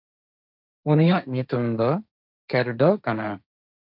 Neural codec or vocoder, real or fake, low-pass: codec, 16 kHz, 1.1 kbps, Voila-Tokenizer; fake; 5.4 kHz